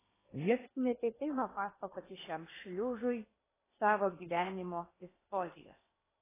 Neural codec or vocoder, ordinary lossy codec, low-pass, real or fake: codec, 16 kHz in and 24 kHz out, 0.8 kbps, FocalCodec, streaming, 65536 codes; AAC, 16 kbps; 3.6 kHz; fake